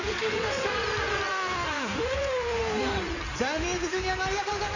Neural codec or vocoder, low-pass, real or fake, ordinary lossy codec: codec, 16 kHz in and 24 kHz out, 2.2 kbps, FireRedTTS-2 codec; 7.2 kHz; fake; none